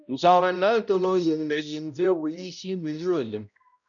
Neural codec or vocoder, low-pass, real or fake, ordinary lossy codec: codec, 16 kHz, 0.5 kbps, X-Codec, HuBERT features, trained on balanced general audio; 7.2 kHz; fake; MP3, 96 kbps